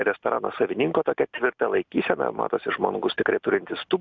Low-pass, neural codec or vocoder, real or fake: 7.2 kHz; none; real